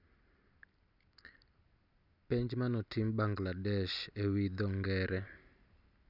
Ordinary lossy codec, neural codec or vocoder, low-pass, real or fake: none; none; 5.4 kHz; real